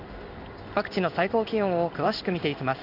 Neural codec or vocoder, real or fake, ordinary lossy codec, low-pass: codec, 16 kHz in and 24 kHz out, 1 kbps, XY-Tokenizer; fake; none; 5.4 kHz